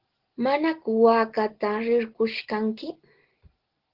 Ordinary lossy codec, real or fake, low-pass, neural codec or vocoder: Opus, 16 kbps; real; 5.4 kHz; none